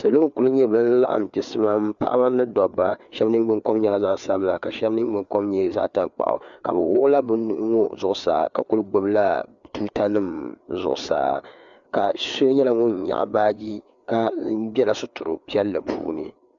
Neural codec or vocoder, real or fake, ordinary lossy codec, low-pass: codec, 16 kHz, 4 kbps, FreqCodec, larger model; fake; MP3, 96 kbps; 7.2 kHz